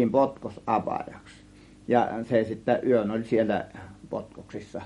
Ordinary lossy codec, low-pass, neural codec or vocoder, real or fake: MP3, 48 kbps; 19.8 kHz; none; real